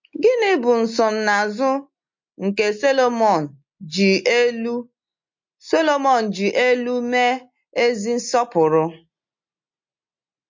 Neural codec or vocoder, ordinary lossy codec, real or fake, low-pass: none; MP3, 48 kbps; real; 7.2 kHz